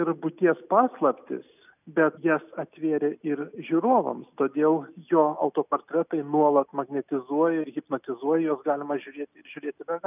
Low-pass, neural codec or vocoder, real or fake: 3.6 kHz; none; real